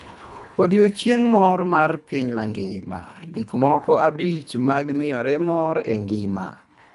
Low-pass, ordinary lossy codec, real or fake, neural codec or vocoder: 10.8 kHz; none; fake; codec, 24 kHz, 1.5 kbps, HILCodec